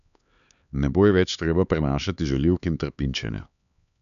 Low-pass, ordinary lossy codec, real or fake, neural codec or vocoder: 7.2 kHz; none; fake; codec, 16 kHz, 4 kbps, X-Codec, HuBERT features, trained on LibriSpeech